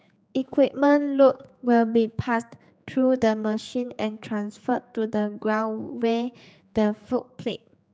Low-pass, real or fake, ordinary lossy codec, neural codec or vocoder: none; fake; none; codec, 16 kHz, 4 kbps, X-Codec, HuBERT features, trained on general audio